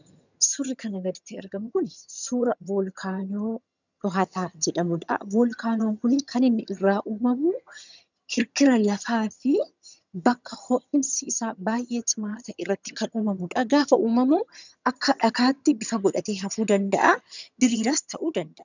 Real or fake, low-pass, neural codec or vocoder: fake; 7.2 kHz; vocoder, 22.05 kHz, 80 mel bands, HiFi-GAN